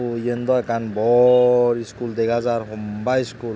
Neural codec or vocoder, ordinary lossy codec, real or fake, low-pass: none; none; real; none